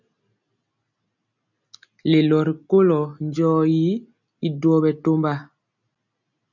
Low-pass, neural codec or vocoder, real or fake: 7.2 kHz; none; real